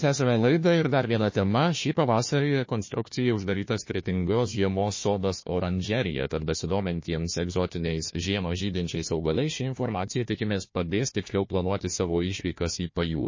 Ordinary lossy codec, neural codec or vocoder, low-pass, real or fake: MP3, 32 kbps; codec, 16 kHz, 1 kbps, FunCodec, trained on Chinese and English, 50 frames a second; 7.2 kHz; fake